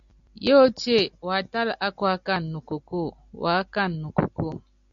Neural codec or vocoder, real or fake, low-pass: none; real; 7.2 kHz